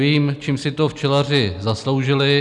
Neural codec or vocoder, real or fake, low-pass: none; real; 10.8 kHz